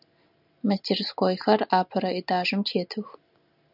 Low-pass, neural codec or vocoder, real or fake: 5.4 kHz; none; real